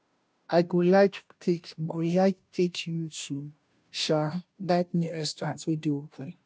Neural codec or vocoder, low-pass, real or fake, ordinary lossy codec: codec, 16 kHz, 0.5 kbps, FunCodec, trained on Chinese and English, 25 frames a second; none; fake; none